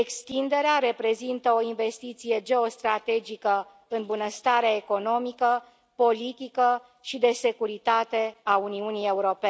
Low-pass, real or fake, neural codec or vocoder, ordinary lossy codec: none; real; none; none